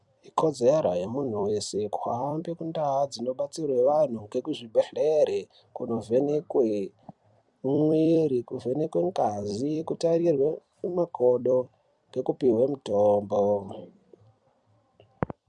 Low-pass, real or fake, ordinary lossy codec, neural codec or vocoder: 10.8 kHz; fake; MP3, 96 kbps; vocoder, 44.1 kHz, 128 mel bands every 256 samples, BigVGAN v2